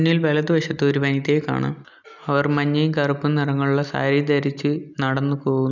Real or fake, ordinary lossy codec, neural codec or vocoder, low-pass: real; none; none; 7.2 kHz